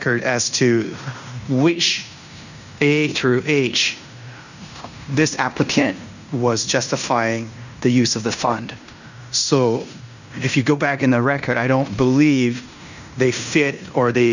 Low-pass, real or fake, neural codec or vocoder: 7.2 kHz; fake; codec, 16 kHz in and 24 kHz out, 0.9 kbps, LongCat-Audio-Codec, fine tuned four codebook decoder